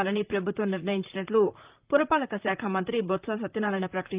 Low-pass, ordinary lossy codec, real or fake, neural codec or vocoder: 3.6 kHz; Opus, 32 kbps; fake; vocoder, 44.1 kHz, 128 mel bands, Pupu-Vocoder